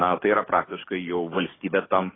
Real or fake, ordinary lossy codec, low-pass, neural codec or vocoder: fake; AAC, 16 kbps; 7.2 kHz; codec, 44.1 kHz, 7.8 kbps, DAC